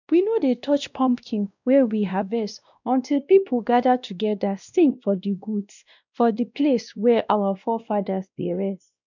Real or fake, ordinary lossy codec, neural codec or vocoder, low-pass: fake; none; codec, 16 kHz, 1 kbps, X-Codec, WavLM features, trained on Multilingual LibriSpeech; 7.2 kHz